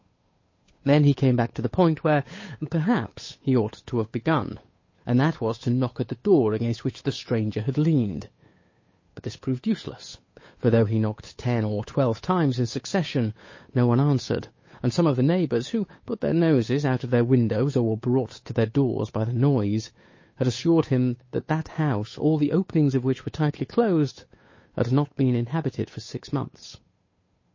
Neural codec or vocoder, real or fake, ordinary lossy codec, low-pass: codec, 16 kHz, 8 kbps, FunCodec, trained on Chinese and English, 25 frames a second; fake; MP3, 32 kbps; 7.2 kHz